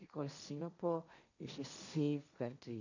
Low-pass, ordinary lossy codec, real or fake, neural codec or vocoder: 7.2 kHz; none; fake; codec, 16 kHz, 1.1 kbps, Voila-Tokenizer